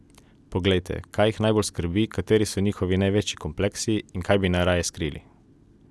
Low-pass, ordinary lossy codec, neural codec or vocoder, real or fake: none; none; none; real